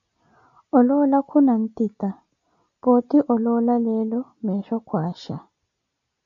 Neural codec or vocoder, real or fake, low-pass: none; real; 7.2 kHz